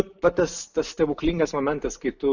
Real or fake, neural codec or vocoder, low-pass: real; none; 7.2 kHz